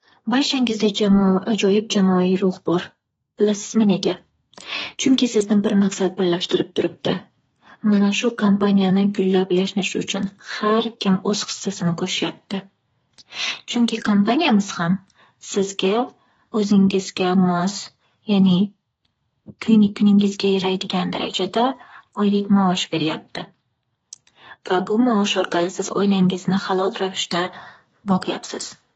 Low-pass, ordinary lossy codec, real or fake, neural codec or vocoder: 14.4 kHz; AAC, 24 kbps; fake; codec, 32 kHz, 1.9 kbps, SNAC